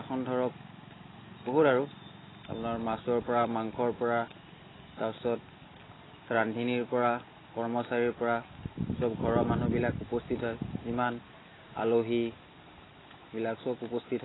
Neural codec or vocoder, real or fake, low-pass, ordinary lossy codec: none; real; 7.2 kHz; AAC, 16 kbps